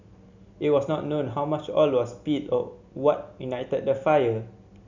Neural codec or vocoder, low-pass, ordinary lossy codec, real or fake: none; 7.2 kHz; none; real